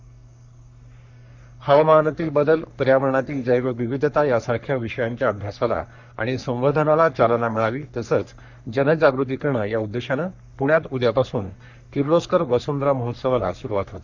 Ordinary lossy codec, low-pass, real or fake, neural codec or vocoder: none; 7.2 kHz; fake; codec, 44.1 kHz, 3.4 kbps, Pupu-Codec